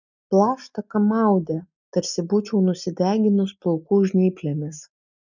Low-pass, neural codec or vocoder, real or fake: 7.2 kHz; none; real